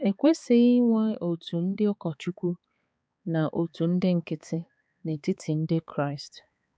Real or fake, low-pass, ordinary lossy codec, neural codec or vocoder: fake; none; none; codec, 16 kHz, 4 kbps, X-Codec, HuBERT features, trained on balanced general audio